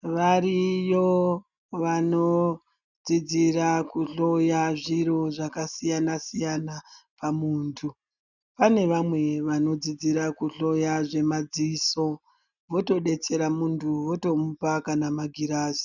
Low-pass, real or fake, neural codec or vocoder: 7.2 kHz; real; none